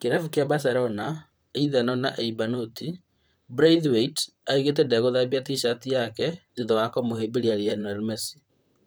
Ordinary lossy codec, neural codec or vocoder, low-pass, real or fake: none; vocoder, 44.1 kHz, 128 mel bands, Pupu-Vocoder; none; fake